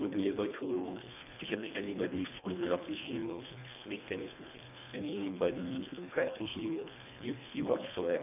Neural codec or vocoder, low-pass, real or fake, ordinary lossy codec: codec, 24 kHz, 1.5 kbps, HILCodec; 3.6 kHz; fake; none